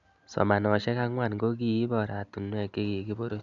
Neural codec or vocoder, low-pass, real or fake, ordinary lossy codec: none; 7.2 kHz; real; none